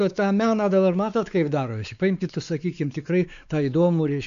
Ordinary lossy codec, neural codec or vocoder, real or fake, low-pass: MP3, 96 kbps; codec, 16 kHz, 4 kbps, X-Codec, WavLM features, trained on Multilingual LibriSpeech; fake; 7.2 kHz